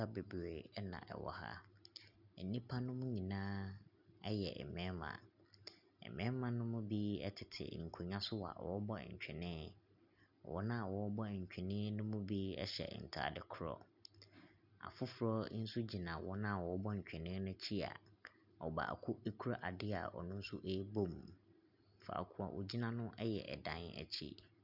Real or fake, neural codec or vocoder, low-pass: real; none; 5.4 kHz